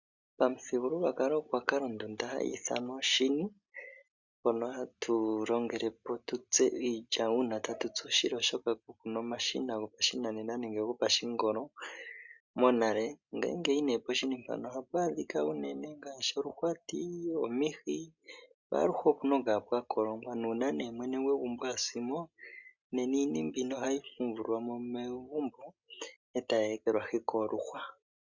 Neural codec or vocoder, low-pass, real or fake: none; 7.2 kHz; real